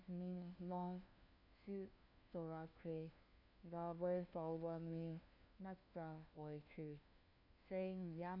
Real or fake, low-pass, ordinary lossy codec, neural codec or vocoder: fake; 5.4 kHz; none; codec, 16 kHz, 1 kbps, FunCodec, trained on LibriTTS, 50 frames a second